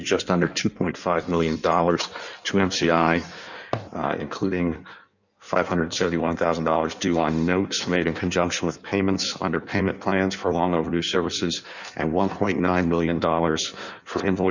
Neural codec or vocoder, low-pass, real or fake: codec, 16 kHz in and 24 kHz out, 1.1 kbps, FireRedTTS-2 codec; 7.2 kHz; fake